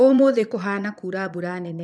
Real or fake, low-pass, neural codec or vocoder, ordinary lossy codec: fake; none; vocoder, 22.05 kHz, 80 mel bands, Vocos; none